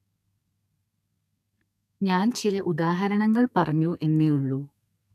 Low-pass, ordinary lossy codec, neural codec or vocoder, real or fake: 14.4 kHz; none; codec, 32 kHz, 1.9 kbps, SNAC; fake